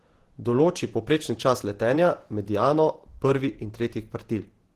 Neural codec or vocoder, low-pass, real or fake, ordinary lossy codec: vocoder, 48 kHz, 128 mel bands, Vocos; 14.4 kHz; fake; Opus, 16 kbps